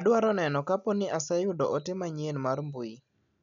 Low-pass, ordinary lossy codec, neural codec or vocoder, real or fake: 7.2 kHz; none; none; real